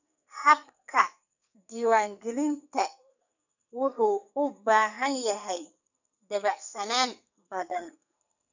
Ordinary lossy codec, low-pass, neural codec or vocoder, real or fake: none; 7.2 kHz; codec, 44.1 kHz, 2.6 kbps, SNAC; fake